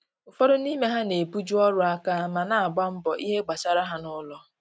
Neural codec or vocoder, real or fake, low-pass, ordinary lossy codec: none; real; none; none